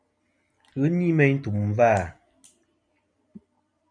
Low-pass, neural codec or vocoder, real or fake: 9.9 kHz; vocoder, 44.1 kHz, 128 mel bands every 256 samples, BigVGAN v2; fake